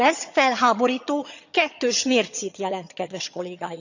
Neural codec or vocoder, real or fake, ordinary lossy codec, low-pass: vocoder, 22.05 kHz, 80 mel bands, HiFi-GAN; fake; none; 7.2 kHz